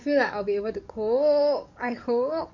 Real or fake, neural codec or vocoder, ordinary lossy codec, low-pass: fake; codec, 44.1 kHz, 7.8 kbps, DAC; none; 7.2 kHz